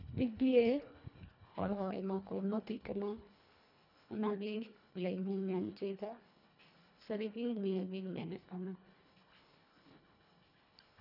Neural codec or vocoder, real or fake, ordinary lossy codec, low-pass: codec, 24 kHz, 1.5 kbps, HILCodec; fake; none; 5.4 kHz